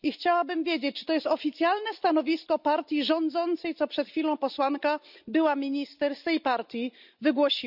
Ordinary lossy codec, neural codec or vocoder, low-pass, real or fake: none; none; 5.4 kHz; real